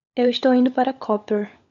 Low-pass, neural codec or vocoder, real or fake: 7.2 kHz; codec, 16 kHz, 16 kbps, FunCodec, trained on LibriTTS, 50 frames a second; fake